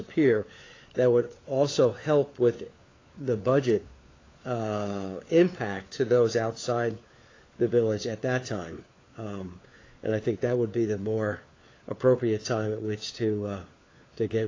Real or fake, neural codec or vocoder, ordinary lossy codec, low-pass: fake; codec, 16 kHz, 4 kbps, FunCodec, trained on LibriTTS, 50 frames a second; AAC, 32 kbps; 7.2 kHz